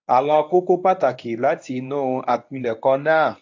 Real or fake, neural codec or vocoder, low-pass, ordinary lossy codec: fake; codec, 24 kHz, 0.9 kbps, WavTokenizer, medium speech release version 1; 7.2 kHz; AAC, 48 kbps